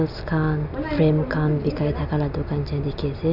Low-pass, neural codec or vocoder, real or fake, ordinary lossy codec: 5.4 kHz; none; real; none